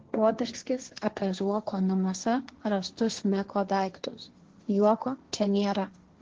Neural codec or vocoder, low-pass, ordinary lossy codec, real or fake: codec, 16 kHz, 1.1 kbps, Voila-Tokenizer; 7.2 kHz; Opus, 16 kbps; fake